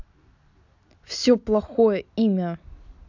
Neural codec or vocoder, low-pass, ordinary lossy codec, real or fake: none; 7.2 kHz; none; real